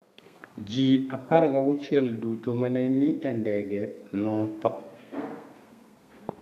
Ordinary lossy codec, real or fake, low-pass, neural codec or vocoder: none; fake; 14.4 kHz; codec, 32 kHz, 1.9 kbps, SNAC